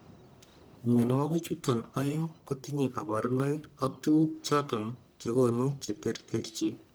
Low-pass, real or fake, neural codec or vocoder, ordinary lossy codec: none; fake; codec, 44.1 kHz, 1.7 kbps, Pupu-Codec; none